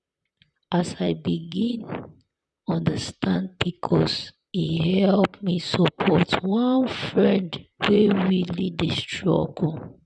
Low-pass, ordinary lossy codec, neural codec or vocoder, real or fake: 10.8 kHz; none; vocoder, 44.1 kHz, 128 mel bands, Pupu-Vocoder; fake